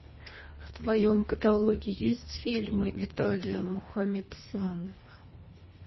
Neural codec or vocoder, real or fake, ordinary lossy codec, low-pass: codec, 24 kHz, 1.5 kbps, HILCodec; fake; MP3, 24 kbps; 7.2 kHz